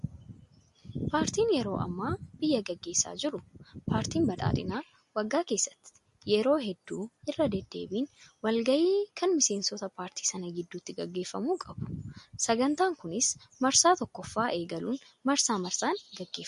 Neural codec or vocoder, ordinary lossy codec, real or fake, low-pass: none; MP3, 48 kbps; real; 14.4 kHz